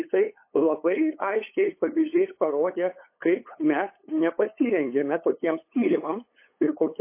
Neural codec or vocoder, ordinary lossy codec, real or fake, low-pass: codec, 16 kHz, 8 kbps, FunCodec, trained on LibriTTS, 25 frames a second; MP3, 24 kbps; fake; 3.6 kHz